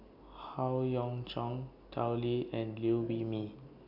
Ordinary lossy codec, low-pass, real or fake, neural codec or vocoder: none; 5.4 kHz; real; none